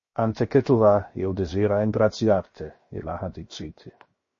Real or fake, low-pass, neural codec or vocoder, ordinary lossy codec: fake; 7.2 kHz; codec, 16 kHz, 0.7 kbps, FocalCodec; MP3, 32 kbps